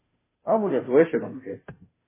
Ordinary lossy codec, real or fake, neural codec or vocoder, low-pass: MP3, 16 kbps; fake; codec, 16 kHz, 0.5 kbps, FunCodec, trained on Chinese and English, 25 frames a second; 3.6 kHz